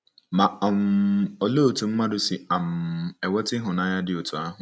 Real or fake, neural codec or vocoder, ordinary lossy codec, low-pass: real; none; none; none